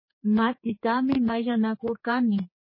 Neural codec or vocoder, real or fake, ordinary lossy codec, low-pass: codec, 16 kHz, 4 kbps, X-Codec, HuBERT features, trained on general audio; fake; MP3, 24 kbps; 5.4 kHz